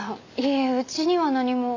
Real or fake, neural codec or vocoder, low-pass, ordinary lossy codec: real; none; 7.2 kHz; AAC, 48 kbps